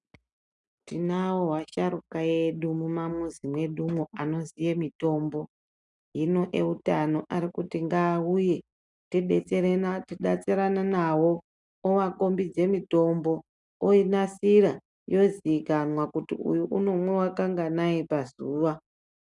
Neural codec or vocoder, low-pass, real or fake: none; 10.8 kHz; real